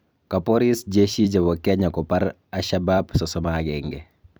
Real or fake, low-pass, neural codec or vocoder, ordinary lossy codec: fake; none; vocoder, 44.1 kHz, 128 mel bands every 256 samples, BigVGAN v2; none